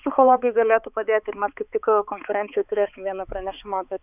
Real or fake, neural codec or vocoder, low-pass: fake; codec, 16 kHz, 4 kbps, X-Codec, HuBERT features, trained on balanced general audio; 3.6 kHz